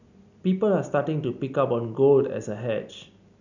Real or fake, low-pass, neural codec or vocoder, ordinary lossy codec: real; 7.2 kHz; none; none